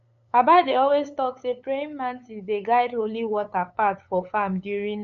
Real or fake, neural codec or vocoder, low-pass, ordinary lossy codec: fake; codec, 16 kHz, 8 kbps, FunCodec, trained on LibriTTS, 25 frames a second; 7.2 kHz; none